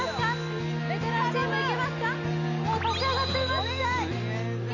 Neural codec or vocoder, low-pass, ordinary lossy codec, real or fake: none; 7.2 kHz; none; real